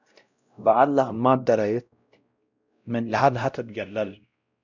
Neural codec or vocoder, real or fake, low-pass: codec, 16 kHz, 0.5 kbps, X-Codec, WavLM features, trained on Multilingual LibriSpeech; fake; 7.2 kHz